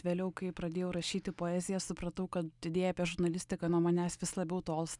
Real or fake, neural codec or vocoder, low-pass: real; none; 10.8 kHz